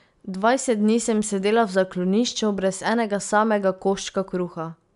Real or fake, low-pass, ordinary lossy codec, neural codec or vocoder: real; 10.8 kHz; none; none